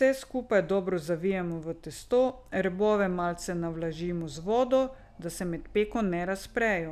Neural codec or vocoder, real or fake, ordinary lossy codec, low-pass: none; real; none; 14.4 kHz